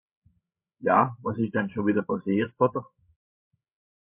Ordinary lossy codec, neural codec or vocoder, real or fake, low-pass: MP3, 32 kbps; codec, 16 kHz, 16 kbps, FreqCodec, larger model; fake; 3.6 kHz